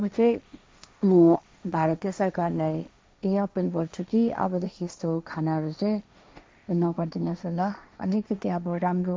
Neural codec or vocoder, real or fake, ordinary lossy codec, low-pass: codec, 16 kHz, 1.1 kbps, Voila-Tokenizer; fake; none; none